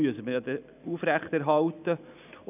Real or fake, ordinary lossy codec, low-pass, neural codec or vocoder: real; none; 3.6 kHz; none